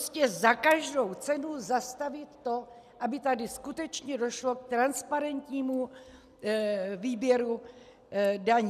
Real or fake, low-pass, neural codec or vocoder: real; 14.4 kHz; none